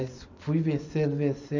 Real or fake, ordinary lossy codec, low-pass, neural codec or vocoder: real; none; 7.2 kHz; none